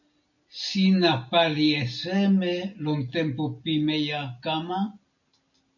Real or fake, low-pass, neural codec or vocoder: real; 7.2 kHz; none